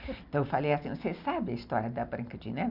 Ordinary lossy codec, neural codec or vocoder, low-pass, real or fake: none; none; 5.4 kHz; real